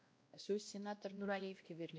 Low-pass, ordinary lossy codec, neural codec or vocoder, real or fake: none; none; codec, 16 kHz, 1 kbps, X-Codec, WavLM features, trained on Multilingual LibriSpeech; fake